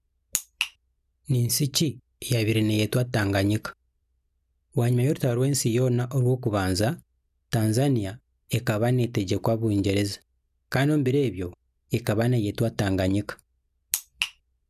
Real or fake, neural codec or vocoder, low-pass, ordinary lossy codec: real; none; 14.4 kHz; none